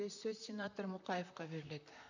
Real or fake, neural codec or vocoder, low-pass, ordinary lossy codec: fake; vocoder, 44.1 kHz, 128 mel bands, Pupu-Vocoder; 7.2 kHz; none